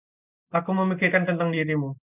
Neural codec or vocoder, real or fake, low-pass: none; real; 3.6 kHz